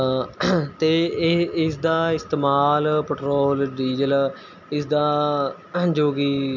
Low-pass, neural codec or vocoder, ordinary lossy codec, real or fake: 7.2 kHz; none; none; real